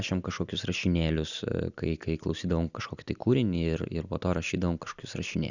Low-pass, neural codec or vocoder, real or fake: 7.2 kHz; none; real